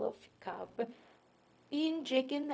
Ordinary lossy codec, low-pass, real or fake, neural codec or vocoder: none; none; fake; codec, 16 kHz, 0.4 kbps, LongCat-Audio-Codec